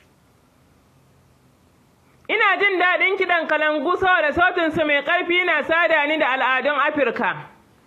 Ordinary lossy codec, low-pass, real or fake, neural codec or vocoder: AAC, 48 kbps; 14.4 kHz; real; none